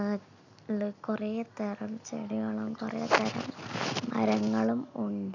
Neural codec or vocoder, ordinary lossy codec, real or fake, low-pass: none; none; real; 7.2 kHz